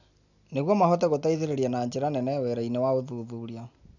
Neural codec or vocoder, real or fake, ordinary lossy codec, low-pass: none; real; none; 7.2 kHz